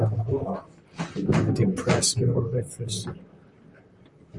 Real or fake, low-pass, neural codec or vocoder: fake; 10.8 kHz; vocoder, 44.1 kHz, 128 mel bands, Pupu-Vocoder